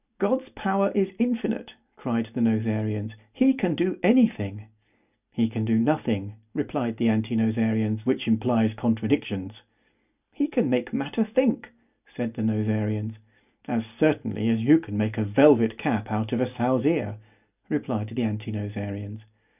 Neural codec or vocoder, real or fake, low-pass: none; real; 3.6 kHz